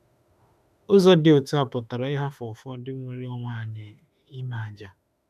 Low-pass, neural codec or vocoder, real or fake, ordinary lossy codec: 14.4 kHz; autoencoder, 48 kHz, 32 numbers a frame, DAC-VAE, trained on Japanese speech; fake; none